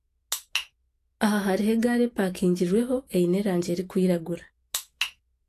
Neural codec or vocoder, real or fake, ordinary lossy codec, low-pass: autoencoder, 48 kHz, 128 numbers a frame, DAC-VAE, trained on Japanese speech; fake; AAC, 48 kbps; 14.4 kHz